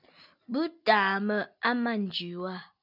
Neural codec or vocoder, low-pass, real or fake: none; 5.4 kHz; real